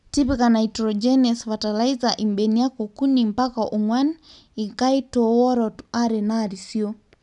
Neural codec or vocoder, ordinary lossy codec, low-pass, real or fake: none; none; 10.8 kHz; real